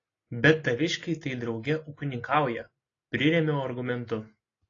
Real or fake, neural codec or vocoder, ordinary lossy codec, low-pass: real; none; AAC, 32 kbps; 7.2 kHz